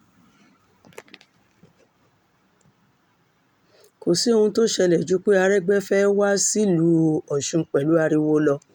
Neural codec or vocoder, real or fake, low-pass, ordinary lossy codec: none; real; 19.8 kHz; none